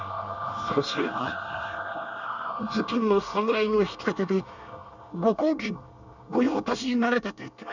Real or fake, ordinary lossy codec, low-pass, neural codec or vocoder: fake; none; 7.2 kHz; codec, 24 kHz, 1 kbps, SNAC